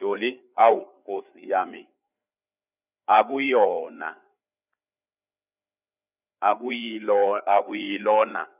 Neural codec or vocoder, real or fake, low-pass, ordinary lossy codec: codec, 16 kHz, 4 kbps, FreqCodec, larger model; fake; 3.6 kHz; none